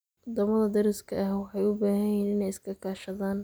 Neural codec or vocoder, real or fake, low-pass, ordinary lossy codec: none; real; none; none